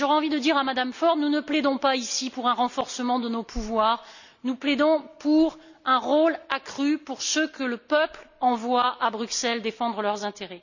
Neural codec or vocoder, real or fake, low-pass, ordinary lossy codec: none; real; 7.2 kHz; none